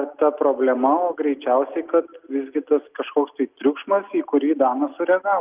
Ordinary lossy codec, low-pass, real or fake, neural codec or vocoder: Opus, 32 kbps; 3.6 kHz; real; none